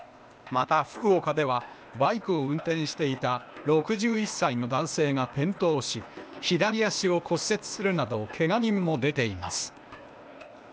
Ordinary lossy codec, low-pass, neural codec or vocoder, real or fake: none; none; codec, 16 kHz, 0.8 kbps, ZipCodec; fake